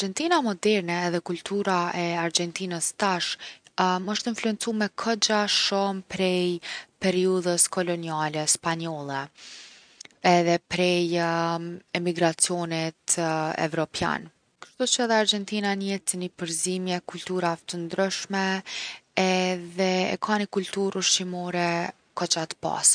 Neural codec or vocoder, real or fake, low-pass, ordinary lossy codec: none; real; 9.9 kHz; none